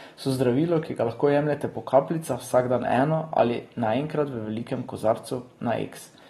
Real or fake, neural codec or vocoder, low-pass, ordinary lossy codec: real; none; 14.4 kHz; AAC, 32 kbps